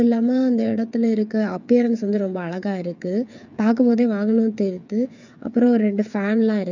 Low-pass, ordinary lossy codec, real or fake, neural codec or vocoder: 7.2 kHz; none; fake; codec, 16 kHz, 8 kbps, FreqCodec, smaller model